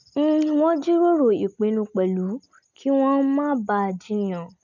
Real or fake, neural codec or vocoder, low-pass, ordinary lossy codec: real; none; 7.2 kHz; none